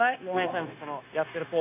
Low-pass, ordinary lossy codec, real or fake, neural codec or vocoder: 3.6 kHz; none; fake; codec, 16 kHz, 0.9 kbps, LongCat-Audio-Codec